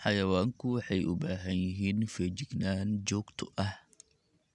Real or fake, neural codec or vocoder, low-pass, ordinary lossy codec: real; none; 10.8 kHz; none